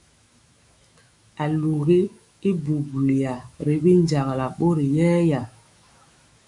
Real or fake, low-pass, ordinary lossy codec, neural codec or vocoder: fake; 10.8 kHz; MP3, 96 kbps; autoencoder, 48 kHz, 128 numbers a frame, DAC-VAE, trained on Japanese speech